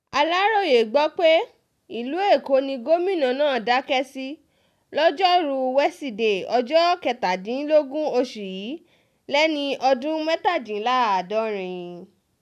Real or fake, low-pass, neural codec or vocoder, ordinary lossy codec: real; 14.4 kHz; none; none